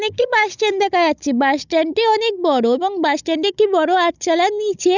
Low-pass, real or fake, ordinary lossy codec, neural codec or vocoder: 7.2 kHz; fake; none; codec, 16 kHz, 16 kbps, FunCodec, trained on Chinese and English, 50 frames a second